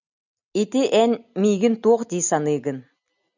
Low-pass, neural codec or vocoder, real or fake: 7.2 kHz; none; real